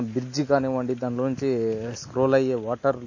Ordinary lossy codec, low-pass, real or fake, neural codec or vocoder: MP3, 32 kbps; 7.2 kHz; real; none